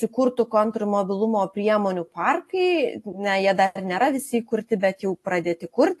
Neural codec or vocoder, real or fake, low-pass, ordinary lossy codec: none; real; 14.4 kHz; AAC, 48 kbps